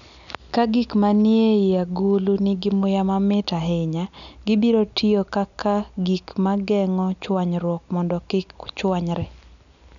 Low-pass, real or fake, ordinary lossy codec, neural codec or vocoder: 7.2 kHz; real; none; none